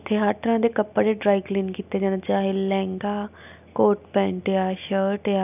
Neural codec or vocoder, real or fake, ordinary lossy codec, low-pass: none; real; none; 3.6 kHz